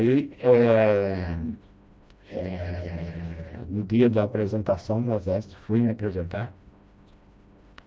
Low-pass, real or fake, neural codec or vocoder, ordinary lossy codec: none; fake; codec, 16 kHz, 1 kbps, FreqCodec, smaller model; none